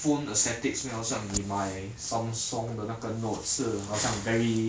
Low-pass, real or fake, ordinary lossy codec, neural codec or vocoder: none; real; none; none